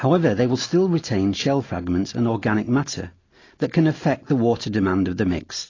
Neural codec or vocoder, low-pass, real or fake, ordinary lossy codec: none; 7.2 kHz; real; AAC, 32 kbps